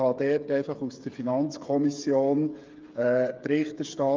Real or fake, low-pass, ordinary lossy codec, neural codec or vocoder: fake; 7.2 kHz; Opus, 32 kbps; codec, 16 kHz, 8 kbps, FreqCodec, smaller model